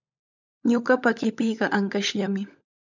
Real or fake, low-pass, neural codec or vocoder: fake; 7.2 kHz; codec, 16 kHz, 16 kbps, FunCodec, trained on LibriTTS, 50 frames a second